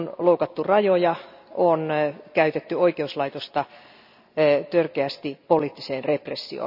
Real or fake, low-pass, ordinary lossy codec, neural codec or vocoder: real; 5.4 kHz; none; none